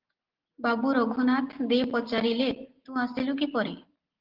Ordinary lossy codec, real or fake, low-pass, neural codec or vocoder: Opus, 16 kbps; real; 5.4 kHz; none